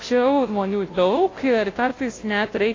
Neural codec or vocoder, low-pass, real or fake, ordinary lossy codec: codec, 16 kHz, 0.5 kbps, FunCodec, trained on Chinese and English, 25 frames a second; 7.2 kHz; fake; AAC, 32 kbps